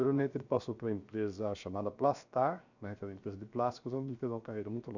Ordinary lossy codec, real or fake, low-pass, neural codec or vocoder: none; fake; 7.2 kHz; codec, 16 kHz, 0.7 kbps, FocalCodec